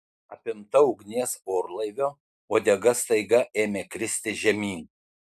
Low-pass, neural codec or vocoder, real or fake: 14.4 kHz; none; real